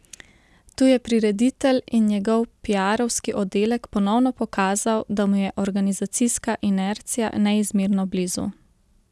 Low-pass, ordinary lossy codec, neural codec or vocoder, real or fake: none; none; none; real